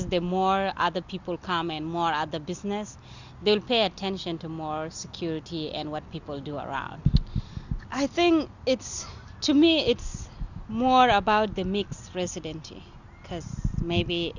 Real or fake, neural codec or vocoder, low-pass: real; none; 7.2 kHz